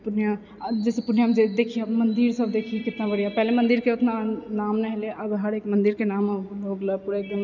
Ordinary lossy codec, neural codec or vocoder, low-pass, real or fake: none; none; 7.2 kHz; real